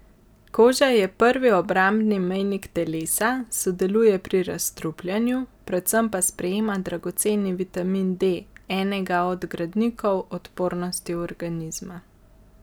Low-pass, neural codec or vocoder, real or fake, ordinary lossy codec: none; none; real; none